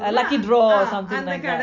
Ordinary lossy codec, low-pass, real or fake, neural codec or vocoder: MP3, 64 kbps; 7.2 kHz; real; none